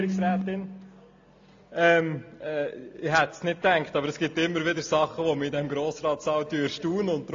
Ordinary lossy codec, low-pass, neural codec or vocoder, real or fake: AAC, 48 kbps; 7.2 kHz; none; real